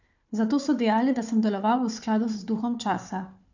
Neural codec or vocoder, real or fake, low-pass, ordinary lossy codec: codec, 16 kHz, 4 kbps, FunCodec, trained on Chinese and English, 50 frames a second; fake; 7.2 kHz; none